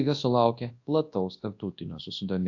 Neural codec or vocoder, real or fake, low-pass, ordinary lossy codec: codec, 24 kHz, 0.9 kbps, WavTokenizer, large speech release; fake; 7.2 kHz; AAC, 48 kbps